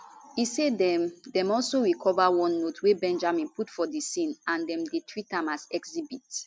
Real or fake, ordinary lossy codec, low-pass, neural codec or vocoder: real; none; none; none